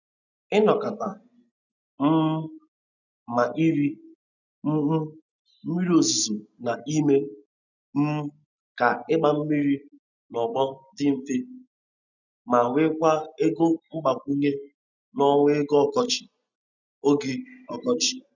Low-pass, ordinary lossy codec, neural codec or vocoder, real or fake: 7.2 kHz; none; none; real